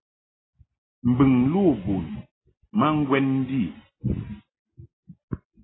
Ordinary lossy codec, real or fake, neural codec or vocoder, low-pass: AAC, 16 kbps; real; none; 7.2 kHz